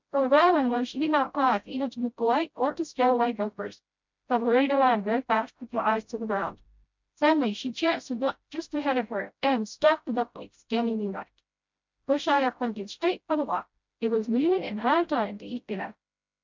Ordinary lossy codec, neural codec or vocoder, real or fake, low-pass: MP3, 64 kbps; codec, 16 kHz, 0.5 kbps, FreqCodec, smaller model; fake; 7.2 kHz